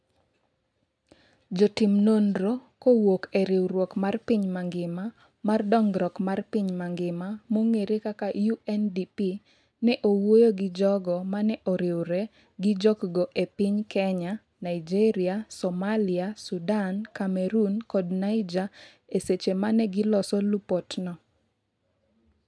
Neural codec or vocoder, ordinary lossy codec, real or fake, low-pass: none; none; real; none